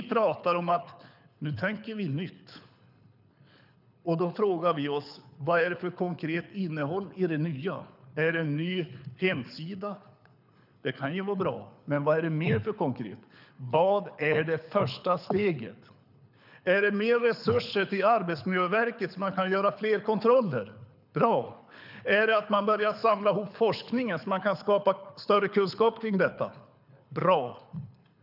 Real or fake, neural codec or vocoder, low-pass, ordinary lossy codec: fake; codec, 24 kHz, 6 kbps, HILCodec; 5.4 kHz; none